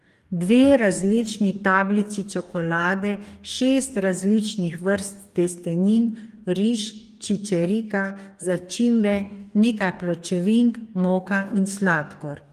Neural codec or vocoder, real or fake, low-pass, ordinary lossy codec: codec, 44.1 kHz, 2.6 kbps, DAC; fake; 14.4 kHz; Opus, 32 kbps